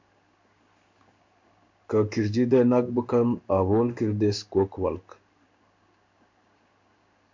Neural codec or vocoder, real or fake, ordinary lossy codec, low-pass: codec, 16 kHz in and 24 kHz out, 1 kbps, XY-Tokenizer; fake; MP3, 64 kbps; 7.2 kHz